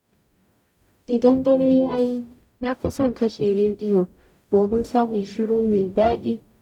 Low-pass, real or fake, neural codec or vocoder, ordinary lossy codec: 19.8 kHz; fake; codec, 44.1 kHz, 0.9 kbps, DAC; none